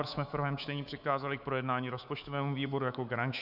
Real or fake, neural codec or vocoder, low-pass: fake; codec, 24 kHz, 3.1 kbps, DualCodec; 5.4 kHz